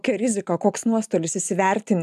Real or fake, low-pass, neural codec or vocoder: real; 14.4 kHz; none